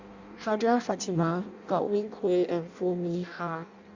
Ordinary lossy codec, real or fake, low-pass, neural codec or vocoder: Opus, 64 kbps; fake; 7.2 kHz; codec, 16 kHz in and 24 kHz out, 0.6 kbps, FireRedTTS-2 codec